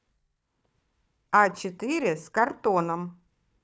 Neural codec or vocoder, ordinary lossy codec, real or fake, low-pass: codec, 16 kHz, 4 kbps, FunCodec, trained on Chinese and English, 50 frames a second; none; fake; none